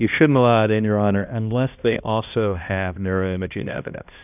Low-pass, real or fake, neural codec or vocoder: 3.6 kHz; fake; codec, 16 kHz, 1 kbps, X-Codec, HuBERT features, trained on balanced general audio